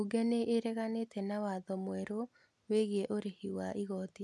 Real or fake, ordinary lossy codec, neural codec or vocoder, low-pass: real; none; none; none